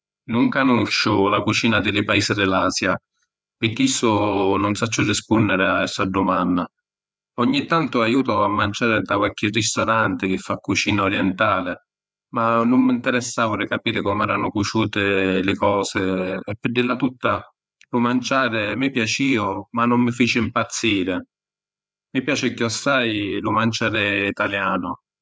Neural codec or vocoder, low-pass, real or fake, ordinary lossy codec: codec, 16 kHz, 4 kbps, FreqCodec, larger model; none; fake; none